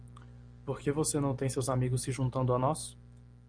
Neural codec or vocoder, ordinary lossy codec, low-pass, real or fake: none; Opus, 32 kbps; 9.9 kHz; real